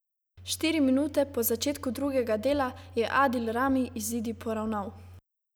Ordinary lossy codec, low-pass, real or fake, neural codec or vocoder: none; none; real; none